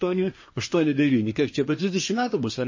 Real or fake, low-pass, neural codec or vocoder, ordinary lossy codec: fake; 7.2 kHz; codec, 24 kHz, 1 kbps, SNAC; MP3, 32 kbps